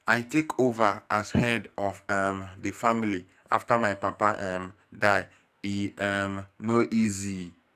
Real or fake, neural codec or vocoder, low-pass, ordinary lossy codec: fake; codec, 44.1 kHz, 2.6 kbps, SNAC; 14.4 kHz; none